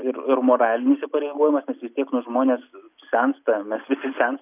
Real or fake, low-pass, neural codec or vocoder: real; 3.6 kHz; none